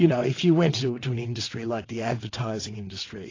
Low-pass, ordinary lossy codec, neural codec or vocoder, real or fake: 7.2 kHz; AAC, 32 kbps; vocoder, 22.05 kHz, 80 mel bands, WaveNeXt; fake